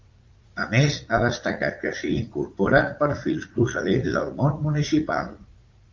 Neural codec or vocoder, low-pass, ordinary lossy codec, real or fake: vocoder, 44.1 kHz, 80 mel bands, Vocos; 7.2 kHz; Opus, 32 kbps; fake